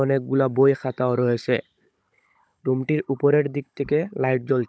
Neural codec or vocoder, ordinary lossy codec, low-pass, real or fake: codec, 16 kHz, 16 kbps, FunCodec, trained on Chinese and English, 50 frames a second; none; none; fake